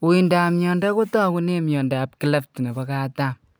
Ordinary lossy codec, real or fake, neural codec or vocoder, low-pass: none; real; none; none